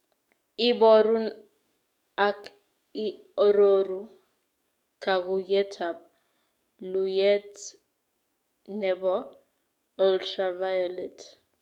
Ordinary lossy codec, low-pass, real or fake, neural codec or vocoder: none; 19.8 kHz; fake; codec, 44.1 kHz, 7.8 kbps, DAC